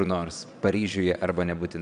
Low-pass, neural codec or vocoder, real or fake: 9.9 kHz; vocoder, 22.05 kHz, 80 mel bands, Vocos; fake